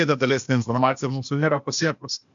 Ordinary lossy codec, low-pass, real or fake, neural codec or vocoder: MP3, 64 kbps; 7.2 kHz; fake; codec, 16 kHz, 0.8 kbps, ZipCodec